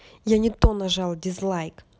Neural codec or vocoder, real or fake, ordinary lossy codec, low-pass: none; real; none; none